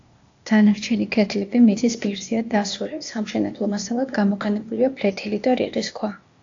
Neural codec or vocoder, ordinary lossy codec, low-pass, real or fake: codec, 16 kHz, 0.8 kbps, ZipCodec; AAC, 48 kbps; 7.2 kHz; fake